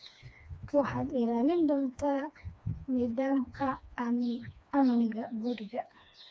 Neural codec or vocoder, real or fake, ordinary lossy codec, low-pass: codec, 16 kHz, 2 kbps, FreqCodec, smaller model; fake; none; none